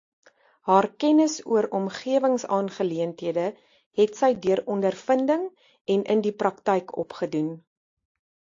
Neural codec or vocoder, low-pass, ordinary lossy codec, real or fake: none; 7.2 kHz; AAC, 48 kbps; real